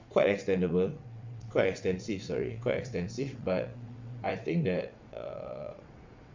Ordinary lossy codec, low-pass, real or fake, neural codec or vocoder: MP3, 64 kbps; 7.2 kHz; fake; vocoder, 22.05 kHz, 80 mel bands, WaveNeXt